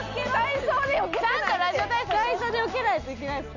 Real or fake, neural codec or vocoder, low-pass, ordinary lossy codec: real; none; 7.2 kHz; none